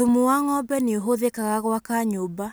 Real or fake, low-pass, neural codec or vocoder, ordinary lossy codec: real; none; none; none